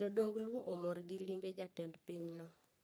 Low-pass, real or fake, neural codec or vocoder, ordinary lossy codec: none; fake; codec, 44.1 kHz, 3.4 kbps, Pupu-Codec; none